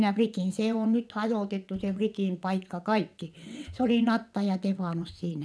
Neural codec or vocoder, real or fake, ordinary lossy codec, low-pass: vocoder, 22.05 kHz, 80 mel bands, WaveNeXt; fake; none; none